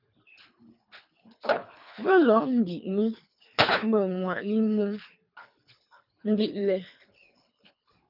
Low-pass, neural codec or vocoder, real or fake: 5.4 kHz; codec, 24 kHz, 3 kbps, HILCodec; fake